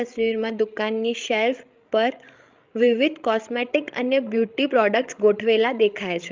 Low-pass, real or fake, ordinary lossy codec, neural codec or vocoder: 7.2 kHz; fake; Opus, 24 kbps; codec, 16 kHz, 16 kbps, FreqCodec, larger model